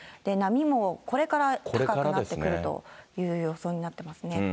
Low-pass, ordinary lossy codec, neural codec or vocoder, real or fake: none; none; none; real